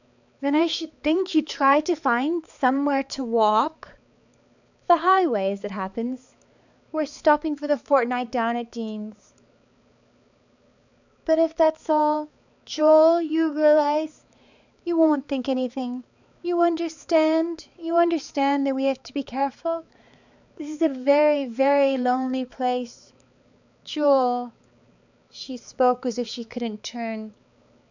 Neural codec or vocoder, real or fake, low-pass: codec, 16 kHz, 4 kbps, X-Codec, HuBERT features, trained on balanced general audio; fake; 7.2 kHz